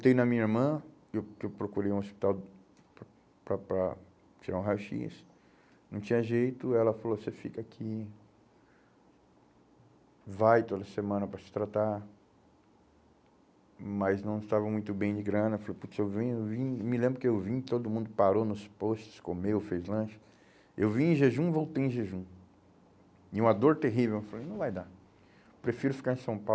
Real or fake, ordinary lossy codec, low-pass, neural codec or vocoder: real; none; none; none